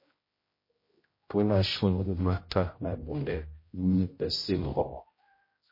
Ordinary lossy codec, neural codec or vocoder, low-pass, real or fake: MP3, 24 kbps; codec, 16 kHz, 0.5 kbps, X-Codec, HuBERT features, trained on general audio; 5.4 kHz; fake